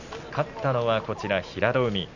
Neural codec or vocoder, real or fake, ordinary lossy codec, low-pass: none; real; none; 7.2 kHz